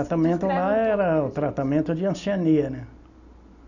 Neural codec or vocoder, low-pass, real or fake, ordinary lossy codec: none; 7.2 kHz; real; none